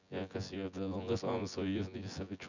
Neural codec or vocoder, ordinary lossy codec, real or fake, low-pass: vocoder, 24 kHz, 100 mel bands, Vocos; none; fake; 7.2 kHz